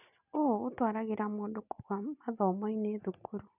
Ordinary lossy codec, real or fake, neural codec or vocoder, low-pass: none; real; none; 3.6 kHz